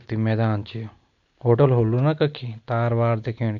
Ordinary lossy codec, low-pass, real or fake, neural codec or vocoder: none; 7.2 kHz; real; none